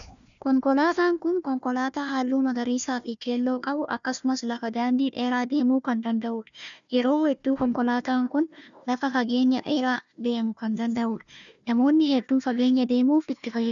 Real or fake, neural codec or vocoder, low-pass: fake; codec, 16 kHz, 1 kbps, FunCodec, trained on Chinese and English, 50 frames a second; 7.2 kHz